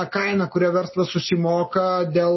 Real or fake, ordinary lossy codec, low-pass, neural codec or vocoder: real; MP3, 24 kbps; 7.2 kHz; none